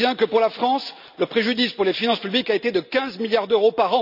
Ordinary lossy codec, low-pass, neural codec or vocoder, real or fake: none; 5.4 kHz; none; real